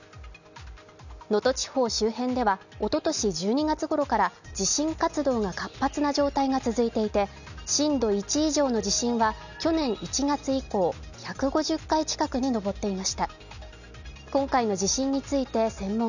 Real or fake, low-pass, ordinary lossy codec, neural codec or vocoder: real; 7.2 kHz; none; none